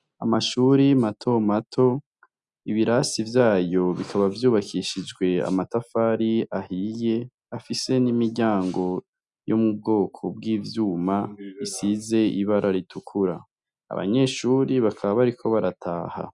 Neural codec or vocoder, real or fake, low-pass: none; real; 10.8 kHz